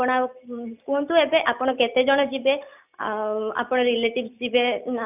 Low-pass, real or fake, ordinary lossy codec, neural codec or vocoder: 3.6 kHz; real; none; none